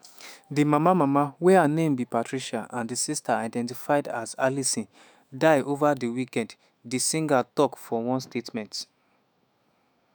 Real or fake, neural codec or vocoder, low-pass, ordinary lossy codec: fake; autoencoder, 48 kHz, 128 numbers a frame, DAC-VAE, trained on Japanese speech; none; none